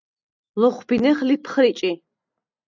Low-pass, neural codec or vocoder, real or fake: 7.2 kHz; none; real